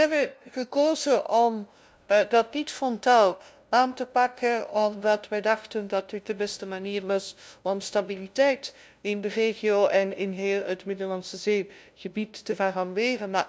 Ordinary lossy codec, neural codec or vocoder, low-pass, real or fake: none; codec, 16 kHz, 0.5 kbps, FunCodec, trained on LibriTTS, 25 frames a second; none; fake